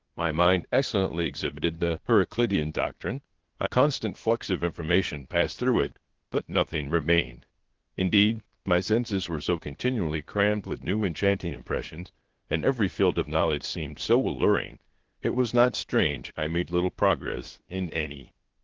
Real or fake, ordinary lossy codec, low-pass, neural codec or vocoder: fake; Opus, 16 kbps; 7.2 kHz; codec, 16 kHz, 0.8 kbps, ZipCodec